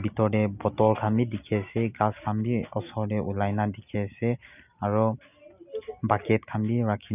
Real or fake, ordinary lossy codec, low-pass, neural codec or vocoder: real; none; 3.6 kHz; none